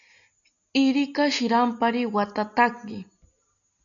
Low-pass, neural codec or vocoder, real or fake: 7.2 kHz; none; real